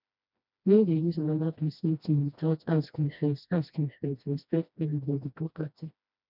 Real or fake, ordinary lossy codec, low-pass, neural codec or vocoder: fake; none; 5.4 kHz; codec, 16 kHz, 1 kbps, FreqCodec, smaller model